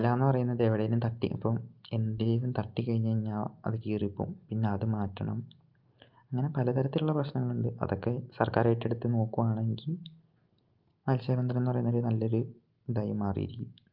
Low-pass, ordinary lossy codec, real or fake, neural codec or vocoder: 5.4 kHz; Opus, 24 kbps; real; none